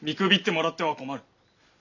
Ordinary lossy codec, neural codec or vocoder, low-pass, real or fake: none; none; 7.2 kHz; real